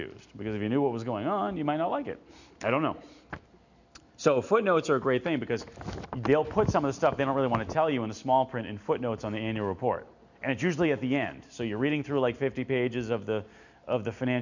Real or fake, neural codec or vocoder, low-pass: real; none; 7.2 kHz